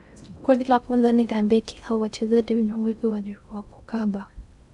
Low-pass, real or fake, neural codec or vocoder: 10.8 kHz; fake; codec, 16 kHz in and 24 kHz out, 0.6 kbps, FocalCodec, streaming, 2048 codes